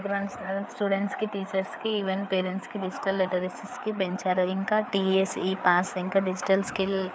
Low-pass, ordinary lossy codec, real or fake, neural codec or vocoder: none; none; fake; codec, 16 kHz, 4 kbps, FreqCodec, larger model